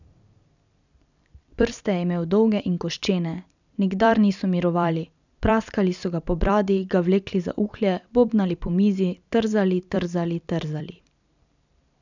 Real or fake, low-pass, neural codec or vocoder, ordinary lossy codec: fake; 7.2 kHz; vocoder, 44.1 kHz, 128 mel bands every 256 samples, BigVGAN v2; none